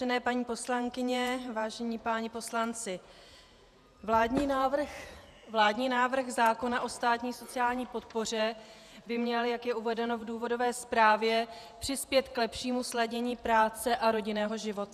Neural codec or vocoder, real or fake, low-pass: vocoder, 48 kHz, 128 mel bands, Vocos; fake; 14.4 kHz